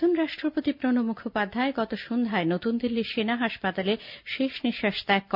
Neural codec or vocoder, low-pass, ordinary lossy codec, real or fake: none; 5.4 kHz; none; real